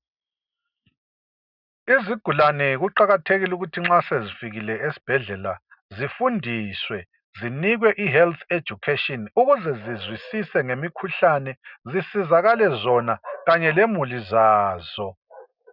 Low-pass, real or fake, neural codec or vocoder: 5.4 kHz; real; none